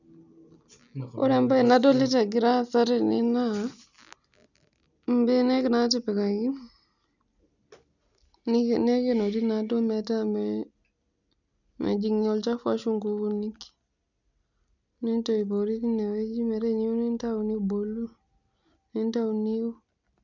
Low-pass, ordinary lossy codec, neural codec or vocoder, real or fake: 7.2 kHz; none; none; real